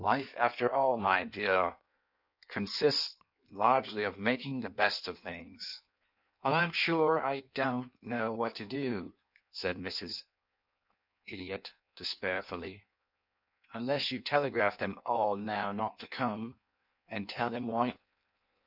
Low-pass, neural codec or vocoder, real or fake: 5.4 kHz; codec, 16 kHz in and 24 kHz out, 1.1 kbps, FireRedTTS-2 codec; fake